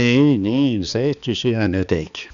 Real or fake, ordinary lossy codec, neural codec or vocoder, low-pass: fake; none; codec, 16 kHz, 2 kbps, X-Codec, HuBERT features, trained on balanced general audio; 7.2 kHz